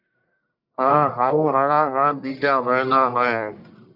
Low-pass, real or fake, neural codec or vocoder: 5.4 kHz; fake; codec, 44.1 kHz, 1.7 kbps, Pupu-Codec